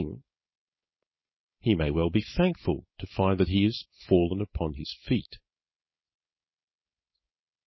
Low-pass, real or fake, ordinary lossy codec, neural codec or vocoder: 7.2 kHz; fake; MP3, 24 kbps; codec, 16 kHz, 4.8 kbps, FACodec